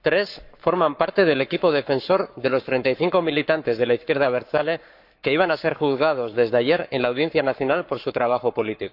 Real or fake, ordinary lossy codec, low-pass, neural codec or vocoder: fake; none; 5.4 kHz; codec, 44.1 kHz, 7.8 kbps, Pupu-Codec